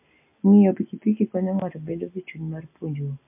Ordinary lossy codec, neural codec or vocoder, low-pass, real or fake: MP3, 24 kbps; none; 3.6 kHz; real